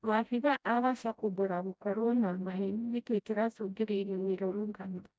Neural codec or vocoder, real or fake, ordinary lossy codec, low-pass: codec, 16 kHz, 0.5 kbps, FreqCodec, smaller model; fake; none; none